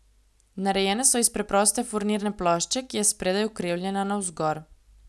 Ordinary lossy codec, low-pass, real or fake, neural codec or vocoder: none; none; real; none